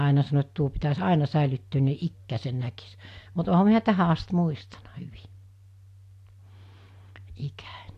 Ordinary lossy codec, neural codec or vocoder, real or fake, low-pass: AAC, 64 kbps; none; real; 14.4 kHz